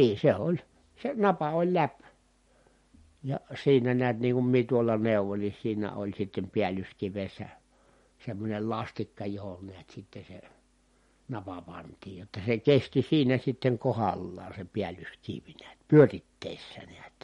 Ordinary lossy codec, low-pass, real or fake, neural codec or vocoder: MP3, 48 kbps; 19.8 kHz; real; none